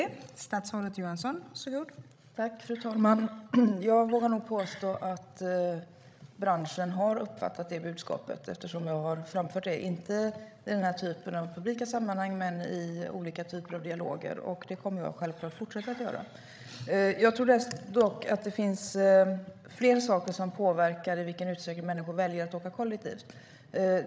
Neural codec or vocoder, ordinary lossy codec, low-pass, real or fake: codec, 16 kHz, 16 kbps, FreqCodec, larger model; none; none; fake